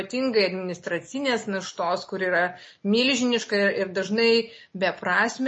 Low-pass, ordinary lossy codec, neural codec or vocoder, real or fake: 9.9 kHz; MP3, 32 kbps; none; real